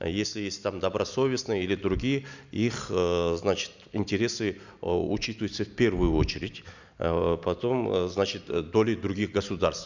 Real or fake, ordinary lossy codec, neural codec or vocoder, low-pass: real; none; none; 7.2 kHz